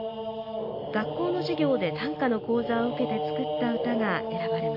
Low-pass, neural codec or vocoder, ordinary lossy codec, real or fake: 5.4 kHz; none; AAC, 32 kbps; real